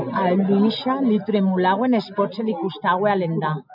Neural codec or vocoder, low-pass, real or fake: none; 5.4 kHz; real